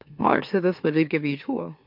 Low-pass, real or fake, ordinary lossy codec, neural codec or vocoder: 5.4 kHz; fake; MP3, 48 kbps; autoencoder, 44.1 kHz, a latent of 192 numbers a frame, MeloTTS